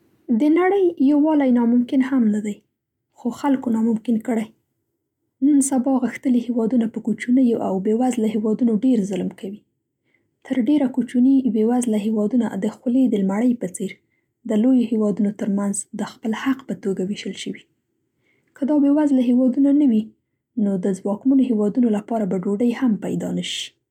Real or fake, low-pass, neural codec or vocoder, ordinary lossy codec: real; 19.8 kHz; none; none